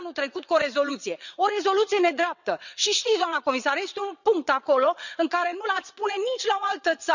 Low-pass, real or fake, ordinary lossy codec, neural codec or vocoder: 7.2 kHz; fake; none; vocoder, 22.05 kHz, 80 mel bands, WaveNeXt